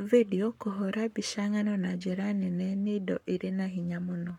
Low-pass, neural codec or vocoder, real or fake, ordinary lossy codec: 19.8 kHz; codec, 44.1 kHz, 7.8 kbps, Pupu-Codec; fake; MP3, 96 kbps